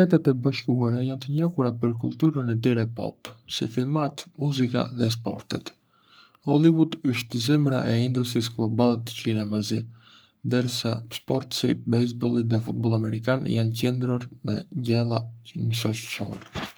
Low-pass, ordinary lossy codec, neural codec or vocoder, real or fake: none; none; codec, 44.1 kHz, 3.4 kbps, Pupu-Codec; fake